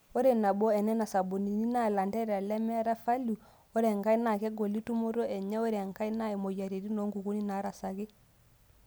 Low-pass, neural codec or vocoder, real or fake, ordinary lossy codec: none; none; real; none